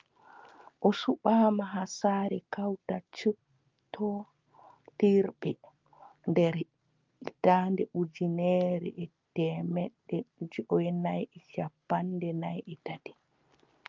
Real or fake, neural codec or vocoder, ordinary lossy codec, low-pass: real; none; Opus, 24 kbps; 7.2 kHz